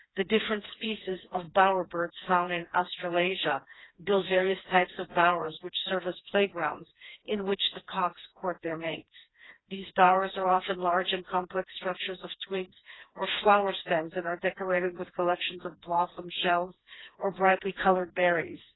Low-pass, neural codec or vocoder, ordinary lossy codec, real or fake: 7.2 kHz; codec, 16 kHz, 2 kbps, FreqCodec, smaller model; AAC, 16 kbps; fake